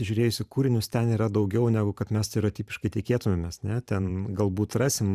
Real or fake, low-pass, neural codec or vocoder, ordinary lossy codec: fake; 14.4 kHz; vocoder, 44.1 kHz, 128 mel bands every 512 samples, BigVGAN v2; Opus, 64 kbps